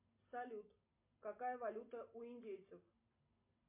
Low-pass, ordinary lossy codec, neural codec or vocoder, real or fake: 3.6 kHz; MP3, 32 kbps; none; real